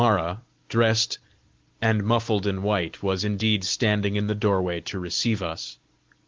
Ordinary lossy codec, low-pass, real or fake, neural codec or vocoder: Opus, 16 kbps; 7.2 kHz; real; none